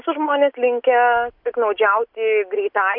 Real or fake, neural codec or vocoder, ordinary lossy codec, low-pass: real; none; Opus, 64 kbps; 5.4 kHz